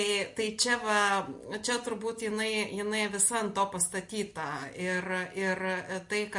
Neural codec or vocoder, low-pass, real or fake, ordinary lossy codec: none; 10.8 kHz; real; MP3, 48 kbps